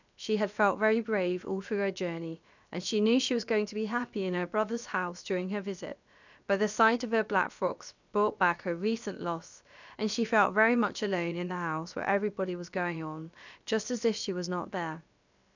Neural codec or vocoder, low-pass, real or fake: codec, 16 kHz, about 1 kbps, DyCAST, with the encoder's durations; 7.2 kHz; fake